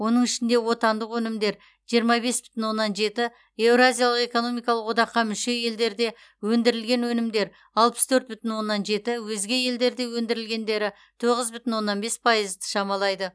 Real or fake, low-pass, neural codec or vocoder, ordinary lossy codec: real; none; none; none